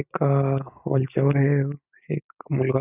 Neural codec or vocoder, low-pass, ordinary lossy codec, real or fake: none; 3.6 kHz; none; real